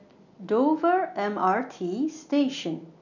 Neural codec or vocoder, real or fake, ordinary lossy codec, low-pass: none; real; none; 7.2 kHz